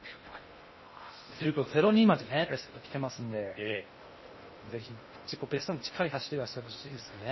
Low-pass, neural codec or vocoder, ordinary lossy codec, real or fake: 7.2 kHz; codec, 16 kHz in and 24 kHz out, 0.6 kbps, FocalCodec, streaming, 2048 codes; MP3, 24 kbps; fake